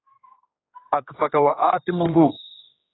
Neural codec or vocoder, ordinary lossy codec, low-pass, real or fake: codec, 16 kHz, 2 kbps, X-Codec, HuBERT features, trained on general audio; AAC, 16 kbps; 7.2 kHz; fake